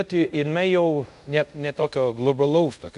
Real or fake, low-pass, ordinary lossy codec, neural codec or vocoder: fake; 10.8 kHz; MP3, 96 kbps; codec, 24 kHz, 0.5 kbps, DualCodec